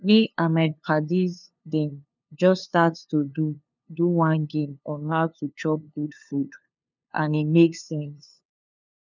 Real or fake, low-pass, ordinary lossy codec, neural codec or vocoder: fake; 7.2 kHz; none; codec, 16 kHz, 2 kbps, FunCodec, trained on LibriTTS, 25 frames a second